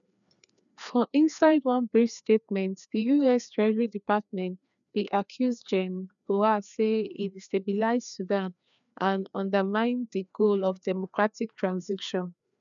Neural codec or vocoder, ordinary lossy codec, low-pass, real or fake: codec, 16 kHz, 2 kbps, FreqCodec, larger model; none; 7.2 kHz; fake